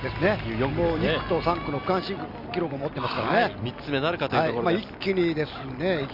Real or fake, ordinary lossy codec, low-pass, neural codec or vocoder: real; none; 5.4 kHz; none